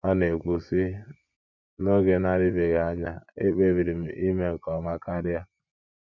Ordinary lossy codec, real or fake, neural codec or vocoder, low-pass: none; fake; vocoder, 24 kHz, 100 mel bands, Vocos; 7.2 kHz